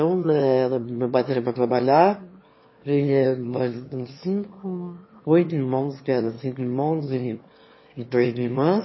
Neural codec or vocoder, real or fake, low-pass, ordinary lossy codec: autoencoder, 22.05 kHz, a latent of 192 numbers a frame, VITS, trained on one speaker; fake; 7.2 kHz; MP3, 24 kbps